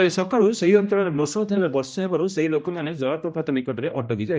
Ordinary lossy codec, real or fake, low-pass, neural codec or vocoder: none; fake; none; codec, 16 kHz, 1 kbps, X-Codec, HuBERT features, trained on general audio